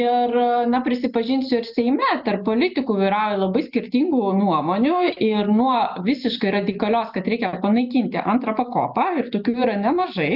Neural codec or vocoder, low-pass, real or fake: none; 5.4 kHz; real